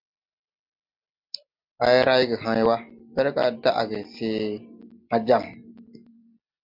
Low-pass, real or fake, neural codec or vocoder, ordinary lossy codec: 5.4 kHz; real; none; MP3, 48 kbps